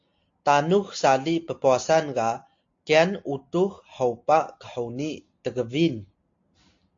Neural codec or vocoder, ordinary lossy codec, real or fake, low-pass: none; AAC, 48 kbps; real; 7.2 kHz